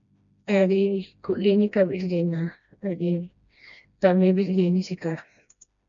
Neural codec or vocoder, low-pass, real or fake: codec, 16 kHz, 1 kbps, FreqCodec, smaller model; 7.2 kHz; fake